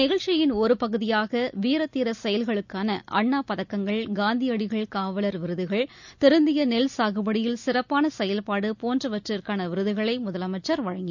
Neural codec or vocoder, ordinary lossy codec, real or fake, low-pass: none; none; real; 7.2 kHz